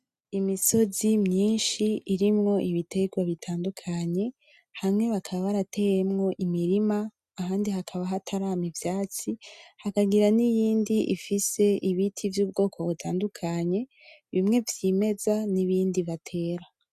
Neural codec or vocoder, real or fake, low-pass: none; real; 14.4 kHz